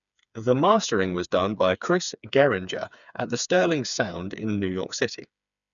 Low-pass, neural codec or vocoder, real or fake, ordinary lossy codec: 7.2 kHz; codec, 16 kHz, 4 kbps, FreqCodec, smaller model; fake; none